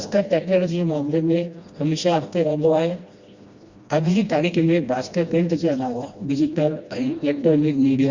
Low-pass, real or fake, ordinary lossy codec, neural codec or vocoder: 7.2 kHz; fake; Opus, 64 kbps; codec, 16 kHz, 1 kbps, FreqCodec, smaller model